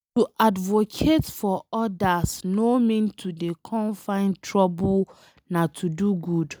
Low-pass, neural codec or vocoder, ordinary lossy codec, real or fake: none; none; none; real